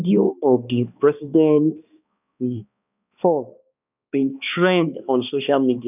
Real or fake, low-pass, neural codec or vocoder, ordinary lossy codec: fake; 3.6 kHz; codec, 16 kHz, 2 kbps, X-Codec, HuBERT features, trained on balanced general audio; none